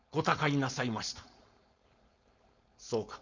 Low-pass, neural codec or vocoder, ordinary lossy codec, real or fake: 7.2 kHz; codec, 16 kHz, 4.8 kbps, FACodec; Opus, 64 kbps; fake